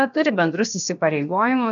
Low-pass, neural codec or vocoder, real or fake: 7.2 kHz; codec, 16 kHz, about 1 kbps, DyCAST, with the encoder's durations; fake